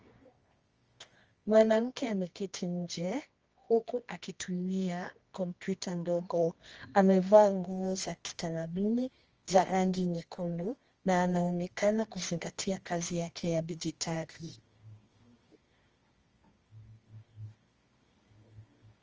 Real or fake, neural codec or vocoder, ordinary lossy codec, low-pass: fake; codec, 24 kHz, 0.9 kbps, WavTokenizer, medium music audio release; Opus, 24 kbps; 7.2 kHz